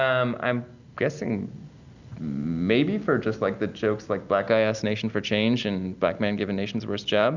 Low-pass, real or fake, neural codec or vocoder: 7.2 kHz; real; none